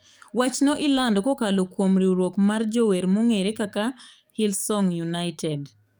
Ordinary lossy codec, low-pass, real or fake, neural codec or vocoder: none; none; fake; codec, 44.1 kHz, 7.8 kbps, DAC